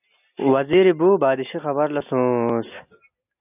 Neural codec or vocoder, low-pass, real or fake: none; 3.6 kHz; real